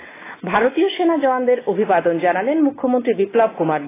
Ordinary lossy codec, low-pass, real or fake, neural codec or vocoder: AAC, 16 kbps; 3.6 kHz; real; none